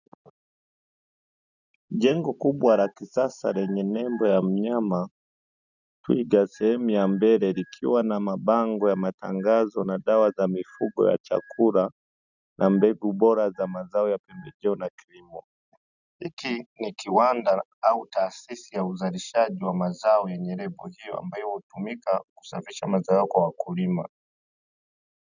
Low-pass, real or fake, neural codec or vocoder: 7.2 kHz; real; none